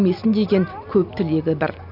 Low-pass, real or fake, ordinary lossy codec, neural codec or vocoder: 5.4 kHz; real; none; none